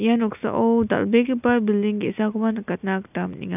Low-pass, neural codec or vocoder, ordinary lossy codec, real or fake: 3.6 kHz; none; none; real